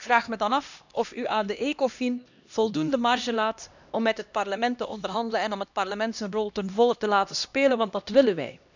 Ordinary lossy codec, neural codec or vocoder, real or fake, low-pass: none; codec, 16 kHz, 1 kbps, X-Codec, HuBERT features, trained on LibriSpeech; fake; 7.2 kHz